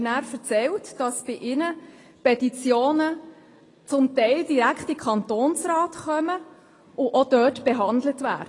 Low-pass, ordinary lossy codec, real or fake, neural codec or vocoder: 10.8 kHz; AAC, 32 kbps; real; none